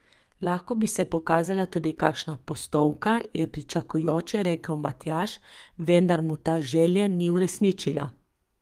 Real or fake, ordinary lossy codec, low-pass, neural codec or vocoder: fake; Opus, 32 kbps; 14.4 kHz; codec, 32 kHz, 1.9 kbps, SNAC